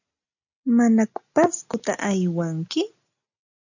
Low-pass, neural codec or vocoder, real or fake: 7.2 kHz; none; real